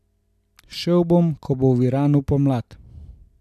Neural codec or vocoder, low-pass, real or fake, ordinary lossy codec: none; 14.4 kHz; real; none